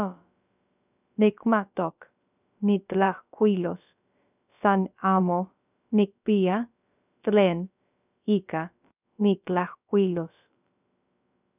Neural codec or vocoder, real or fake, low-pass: codec, 16 kHz, about 1 kbps, DyCAST, with the encoder's durations; fake; 3.6 kHz